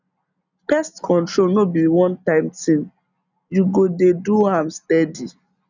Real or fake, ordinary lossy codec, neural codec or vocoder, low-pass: real; none; none; 7.2 kHz